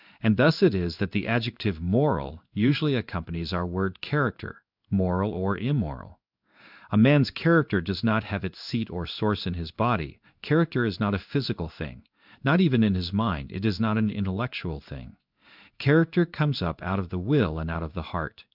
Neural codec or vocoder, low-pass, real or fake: codec, 16 kHz in and 24 kHz out, 1 kbps, XY-Tokenizer; 5.4 kHz; fake